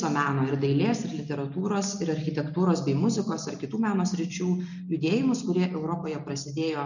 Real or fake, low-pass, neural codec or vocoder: real; 7.2 kHz; none